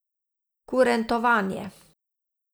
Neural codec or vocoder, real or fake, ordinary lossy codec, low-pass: none; real; none; none